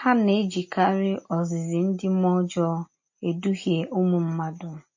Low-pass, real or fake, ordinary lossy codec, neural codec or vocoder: 7.2 kHz; real; MP3, 32 kbps; none